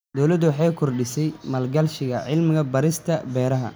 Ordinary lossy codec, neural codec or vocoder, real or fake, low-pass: none; none; real; none